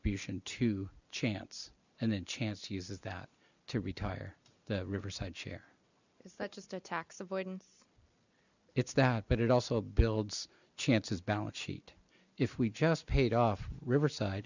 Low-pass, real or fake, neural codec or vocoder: 7.2 kHz; real; none